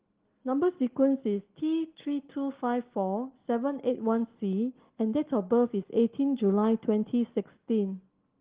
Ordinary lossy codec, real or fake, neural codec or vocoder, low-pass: Opus, 16 kbps; real; none; 3.6 kHz